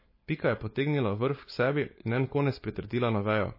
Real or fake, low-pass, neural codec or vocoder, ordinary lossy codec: fake; 5.4 kHz; codec, 16 kHz, 4.8 kbps, FACodec; MP3, 24 kbps